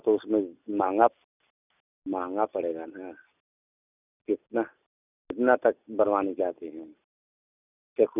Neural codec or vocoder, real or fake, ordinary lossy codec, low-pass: none; real; none; 3.6 kHz